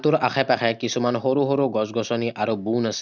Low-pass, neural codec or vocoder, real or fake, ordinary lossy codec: 7.2 kHz; none; real; none